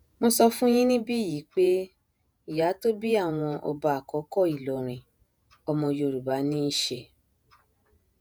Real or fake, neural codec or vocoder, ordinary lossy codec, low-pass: fake; vocoder, 48 kHz, 128 mel bands, Vocos; none; none